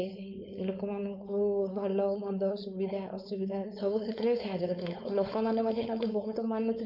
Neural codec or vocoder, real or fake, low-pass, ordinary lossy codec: codec, 16 kHz, 4.8 kbps, FACodec; fake; 5.4 kHz; none